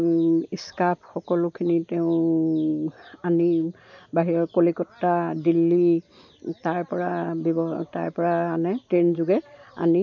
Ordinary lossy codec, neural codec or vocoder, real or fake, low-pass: none; none; real; 7.2 kHz